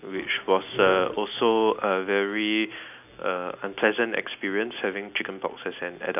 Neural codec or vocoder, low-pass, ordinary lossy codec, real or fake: none; 3.6 kHz; none; real